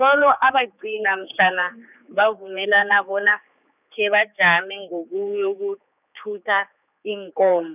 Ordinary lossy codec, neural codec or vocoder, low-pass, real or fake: none; codec, 16 kHz, 4 kbps, X-Codec, HuBERT features, trained on general audio; 3.6 kHz; fake